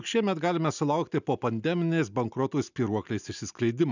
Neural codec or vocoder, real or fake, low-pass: none; real; 7.2 kHz